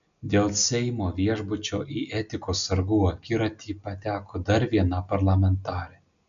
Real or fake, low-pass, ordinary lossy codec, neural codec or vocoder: real; 7.2 kHz; MP3, 96 kbps; none